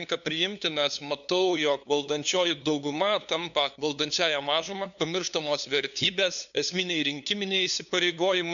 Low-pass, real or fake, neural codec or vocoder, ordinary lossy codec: 7.2 kHz; fake; codec, 16 kHz, 2 kbps, FunCodec, trained on LibriTTS, 25 frames a second; MP3, 96 kbps